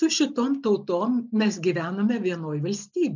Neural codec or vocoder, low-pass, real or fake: none; 7.2 kHz; real